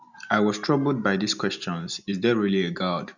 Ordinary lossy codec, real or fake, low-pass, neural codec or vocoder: none; real; 7.2 kHz; none